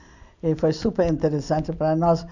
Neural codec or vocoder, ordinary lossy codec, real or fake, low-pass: none; none; real; 7.2 kHz